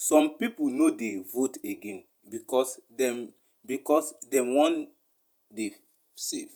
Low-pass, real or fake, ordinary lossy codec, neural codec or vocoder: none; fake; none; vocoder, 48 kHz, 128 mel bands, Vocos